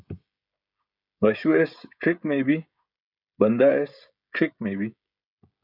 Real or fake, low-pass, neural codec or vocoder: fake; 5.4 kHz; codec, 16 kHz, 16 kbps, FreqCodec, smaller model